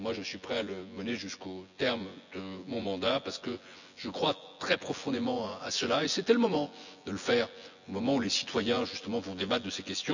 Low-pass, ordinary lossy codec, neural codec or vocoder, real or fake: 7.2 kHz; none; vocoder, 24 kHz, 100 mel bands, Vocos; fake